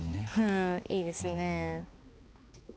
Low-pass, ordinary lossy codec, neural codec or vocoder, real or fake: none; none; codec, 16 kHz, 2 kbps, X-Codec, HuBERT features, trained on balanced general audio; fake